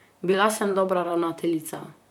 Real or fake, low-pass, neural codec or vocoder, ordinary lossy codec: fake; 19.8 kHz; vocoder, 44.1 kHz, 128 mel bands, Pupu-Vocoder; none